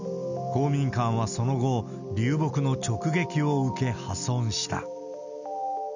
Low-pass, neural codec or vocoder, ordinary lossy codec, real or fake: 7.2 kHz; none; none; real